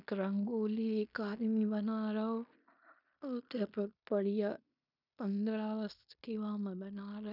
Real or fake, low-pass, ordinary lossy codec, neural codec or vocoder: fake; 5.4 kHz; none; codec, 16 kHz in and 24 kHz out, 0.9 kbps, LongCat-Audio-Codec, fine tuned four codebook decoder